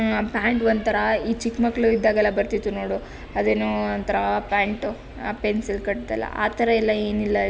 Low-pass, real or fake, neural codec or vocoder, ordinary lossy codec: none; real; none; none